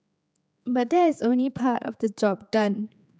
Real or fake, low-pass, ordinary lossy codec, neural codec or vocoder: fake; none; none; codec, 16 kHz, 4 kbps, X-Codec, HuBERT features, trained on general audio